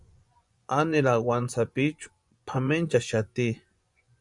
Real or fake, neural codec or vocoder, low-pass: fake; vocoder, 24 kHz, 100 mel bands, Vocos; 10.8 kHz